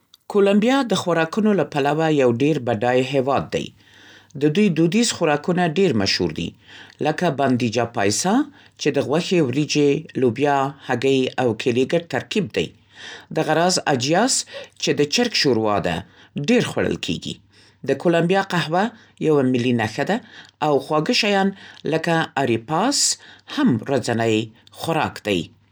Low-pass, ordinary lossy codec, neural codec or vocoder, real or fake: none; none; none; real